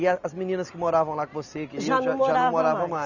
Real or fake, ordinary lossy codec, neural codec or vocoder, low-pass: real; none; none; 7.2 kHz